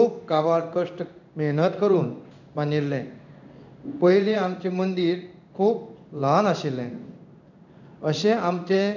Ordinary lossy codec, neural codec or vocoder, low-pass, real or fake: none; codec, 16 kHz in and 24 kHz out, 1 kbps, XY-Tokenizer; 7.2 kHz; fake